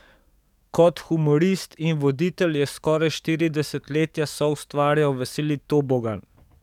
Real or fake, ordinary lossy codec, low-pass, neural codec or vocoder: fake; none; 19.8 kHz; codec, 44.1 kHz, 7.8 kbps, DAC